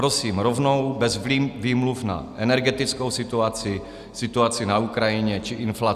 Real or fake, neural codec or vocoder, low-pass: real; none; 14.4 kHz